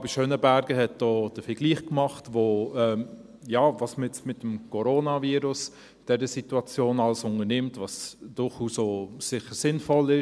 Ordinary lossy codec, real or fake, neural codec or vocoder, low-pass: none; real; none; none